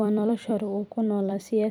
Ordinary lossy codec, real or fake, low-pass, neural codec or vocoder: none; fake; 19.8 kHz; vocoder, 44.1 kHz, 128 mel bands every 256 samples, BigVGAN v2